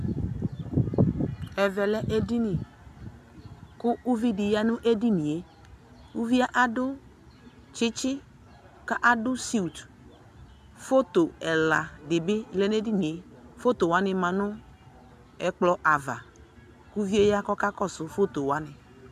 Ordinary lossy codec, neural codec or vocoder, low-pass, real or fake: AAC, 96 kbps; none; 14.4 kHz; real